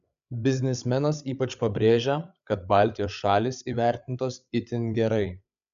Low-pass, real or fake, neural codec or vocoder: 7.2 kHz; fake; codec, 16 kHz, 8 kbps, FreqCodec, larger model